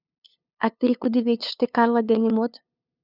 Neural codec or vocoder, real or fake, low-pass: codec, 16 kHz, 2 kbps, FunCodec, trained on LibriTTS, 25 frames a second; fake; 5.4 kHz